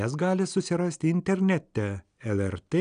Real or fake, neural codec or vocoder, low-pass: real; none; 9.9 kHz